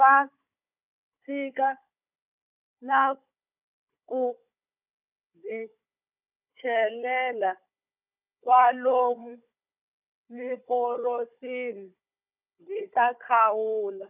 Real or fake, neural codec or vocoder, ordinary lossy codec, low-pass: fake; codec, 16 kHz, 16 kbps, FunCodec, trained on Chinese and English, 50 frames a second; none; 3.6 kHz